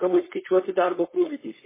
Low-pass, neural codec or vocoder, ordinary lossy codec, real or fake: 3.6 kHz; codec, 16 kHz, 4.8 kbps, FACodec; MP3, 16 kbps; fake